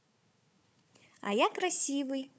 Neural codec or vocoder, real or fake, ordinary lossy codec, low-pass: codec, 16 kHz, 4 kbps, FunCodec, trained on Chinese and English, 50 frames a second; fake; none; none